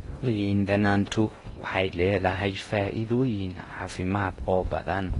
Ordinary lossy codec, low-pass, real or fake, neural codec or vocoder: AAC, 32 kbps; 10.8 kHz; fake; codec, 16 kHz in and 24 kHz out, 0.6 kbps, FocalCodec, streaming, 2048 codes